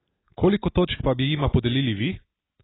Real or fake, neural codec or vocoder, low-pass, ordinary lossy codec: real; none; 7.2 kHz; AAC, 16 kbps